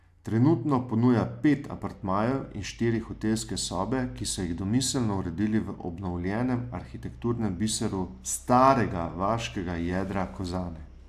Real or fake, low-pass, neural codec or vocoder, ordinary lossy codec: real; 14.4 kHz; none; AAC, 96 kbps